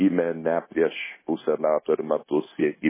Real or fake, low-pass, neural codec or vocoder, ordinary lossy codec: fake; 3.6 kHz; codec, 16 kHz, 0.9 kbps, LongCat-Audio-Codec; MP3, 16 kbps